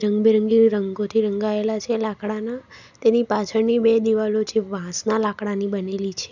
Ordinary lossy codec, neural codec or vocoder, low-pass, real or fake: none; none; 7.2 kHz; real